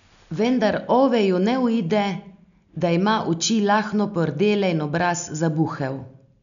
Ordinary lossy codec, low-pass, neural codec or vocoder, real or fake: none; 7.2 kHz; none; real